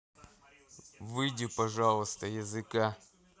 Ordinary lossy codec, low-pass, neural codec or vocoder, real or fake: none; none; none; real